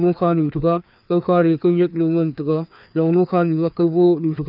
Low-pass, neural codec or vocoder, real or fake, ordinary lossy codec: 5.4 kHz; codec, 16 kHz, 2 kbps, FreqCodec, larger model; fake; none